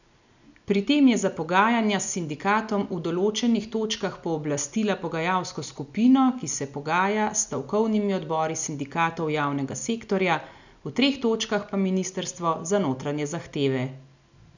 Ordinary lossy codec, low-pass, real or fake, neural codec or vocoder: none; 7.2 kHz; real; none